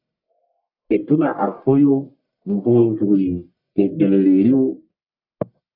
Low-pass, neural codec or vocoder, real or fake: 5.4 kHz; codec, 44.1 kHz, 1.7 kbps, Pupu-Codec; fake